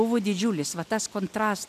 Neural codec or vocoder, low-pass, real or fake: none; 14.4 kHz; real